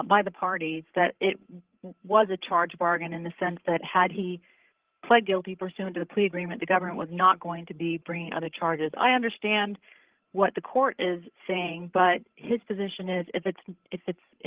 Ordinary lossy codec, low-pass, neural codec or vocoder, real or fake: Opus, 16 kbps; 3.6 kHz; codec, 16 kHz, 16 kbps, FreqCodec, larger model; fake